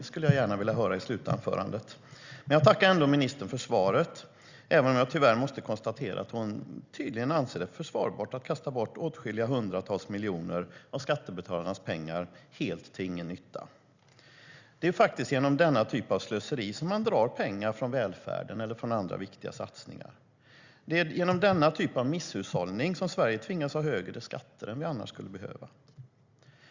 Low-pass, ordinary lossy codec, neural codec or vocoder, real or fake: 7.2 kHz; Opus, 64 kbps; none; real